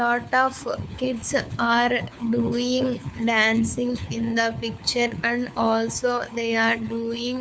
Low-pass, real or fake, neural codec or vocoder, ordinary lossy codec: none; fake; codec, 16 kHz, 4 kbps, FunCodec, trained on LibriTTS, 50 frames a second; none